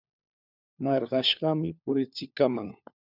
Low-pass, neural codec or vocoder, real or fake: 5.4 kHz; codec, 16 kHz, 4 kbps, FunCodec, trained on LibriTTS, 50 frames a second; fake